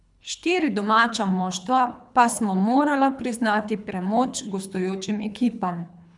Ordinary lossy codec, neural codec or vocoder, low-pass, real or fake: none; codec, 24 kHz, 3 kbps, HILCodec; 10.8 kHz; fake